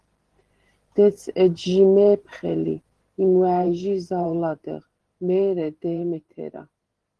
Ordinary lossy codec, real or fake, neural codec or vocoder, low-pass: Opus, 16 kbps; fake; vocoder, 44.1 kHz, 128 mel bands every 512 samples, BigVGAN v2; 10.8 kHz